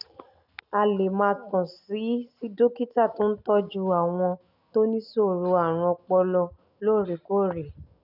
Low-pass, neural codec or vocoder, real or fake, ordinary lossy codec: 5.4 kHz; none; real; none